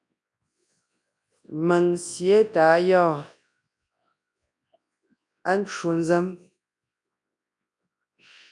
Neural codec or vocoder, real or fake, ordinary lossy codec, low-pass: codec, 24 kHz, 0.9 kbps, WavTokenizer, large speech release; fake; MP3, 96 kbps; 10.8 kHz